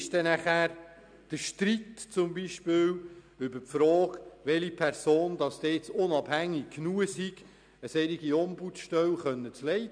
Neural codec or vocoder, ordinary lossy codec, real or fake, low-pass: none; none; real; 9.9 kHz